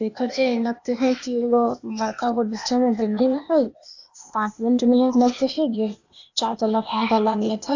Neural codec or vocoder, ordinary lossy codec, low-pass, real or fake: codec, 16 kHz, 0.8 kbps, ZipCodec; AAC, 48 kbps; 7.2 kHz; fake